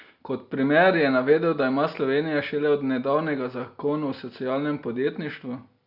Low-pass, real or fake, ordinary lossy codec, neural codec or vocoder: 5.4 kHz; real; Opus, 64 kbps; none